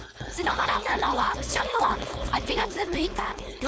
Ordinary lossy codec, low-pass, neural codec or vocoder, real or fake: none; none; codec, 16 kHz, 4.8 kbps, FACodec; fake